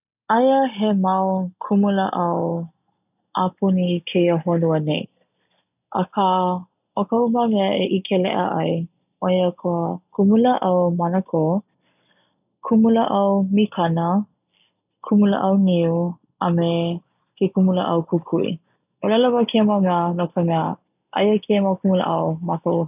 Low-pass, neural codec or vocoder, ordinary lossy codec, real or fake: 3.6 kHz; none; none; real